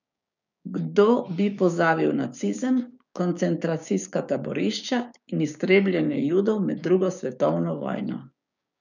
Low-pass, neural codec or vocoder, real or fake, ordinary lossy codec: 7.2 kHz; codec, 16 kHz, 6 kbps, DAC; fake; none